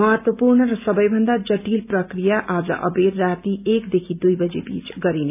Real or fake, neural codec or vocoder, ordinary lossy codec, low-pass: real; none; none; 3.6 kHz